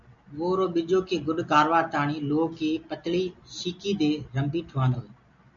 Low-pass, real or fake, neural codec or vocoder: 7.2 kHz; real; none